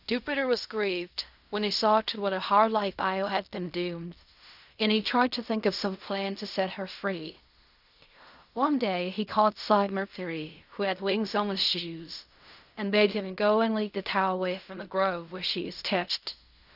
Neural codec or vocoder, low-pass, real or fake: codec, 16 kHz in and 24 kHz out, 0.4 kbps, LongCat-Audio-Codec, fine tuned four codebook decoder; 5.4 kHz; fake